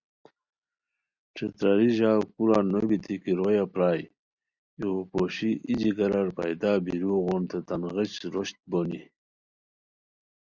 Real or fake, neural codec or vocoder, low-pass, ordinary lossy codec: real; none; 7.2 kHz; Opus, 64 kbps